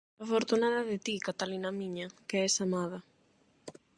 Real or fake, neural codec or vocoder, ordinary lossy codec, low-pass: real; none; Opus, 64 kbps; 9.9 kHz